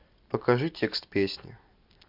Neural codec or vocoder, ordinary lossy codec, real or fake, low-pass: none; MP3, 48 kbps; real; 5.4 kHz